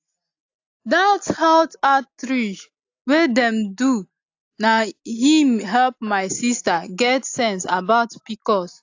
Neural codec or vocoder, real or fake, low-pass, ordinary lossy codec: none; real; 7.2 kHz; AAC, 48 kbps